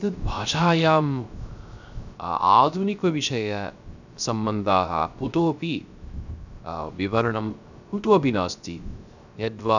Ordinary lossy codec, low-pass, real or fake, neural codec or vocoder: none; 7.2 kHz; fake; codec, 16 kHz, 0.3 kbps, FocalCodec